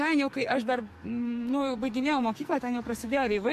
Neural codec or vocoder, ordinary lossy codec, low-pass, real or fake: codec, 44.1 kHz, 2.6 kbps, SNAC; MP3, 64 kbps; 14.4 kHz; fake